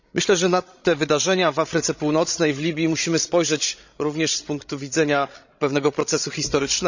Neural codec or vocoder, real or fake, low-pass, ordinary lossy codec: codec, 16 kHz, 16 kbps, FreqCodec, larger model; fake; 7.2 kHz; none